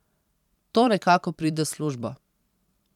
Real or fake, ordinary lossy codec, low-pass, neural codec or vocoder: fake; none; 19.8 kHz; vocoder, 44.1 kHz, 128 mel bands every 512 samples, BigVGAN v2